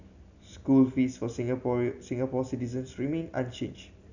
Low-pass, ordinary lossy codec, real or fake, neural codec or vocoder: 7.2 kHz; none; real; none